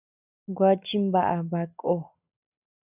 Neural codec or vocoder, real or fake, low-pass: none; real; 3.6 kHz